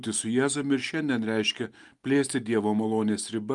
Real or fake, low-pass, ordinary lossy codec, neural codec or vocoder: real; 10.8 kHz; Opus, 32 kbps; none